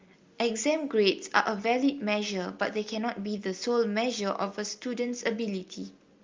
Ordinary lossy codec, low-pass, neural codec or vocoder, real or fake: Opus, 32 kbps; 7.2 kHz; none; real